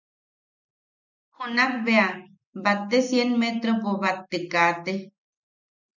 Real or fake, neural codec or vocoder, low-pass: real; none; 7.2 kHz